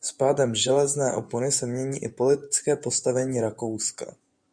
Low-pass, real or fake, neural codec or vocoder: 9.9 kHz; fake; vocoder, 24 kHz, 100 mel bands, Vocos